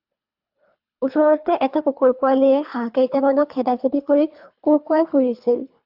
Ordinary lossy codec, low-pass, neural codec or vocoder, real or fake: AAC, 48 kbps; 5.4 kHz; codec, 24 kHz, 3 kbps, HILCodec; fake